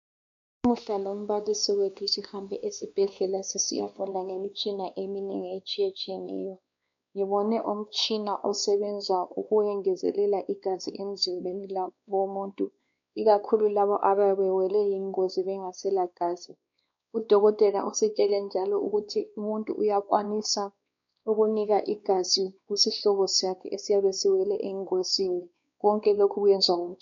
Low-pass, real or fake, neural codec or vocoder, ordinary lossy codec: 7.2 kHz; fake; codec, 16 kHz, 2 kbps, X-Codec, WavLM features, trained on Multilingual LibriSpeech; MP3, 64 kbps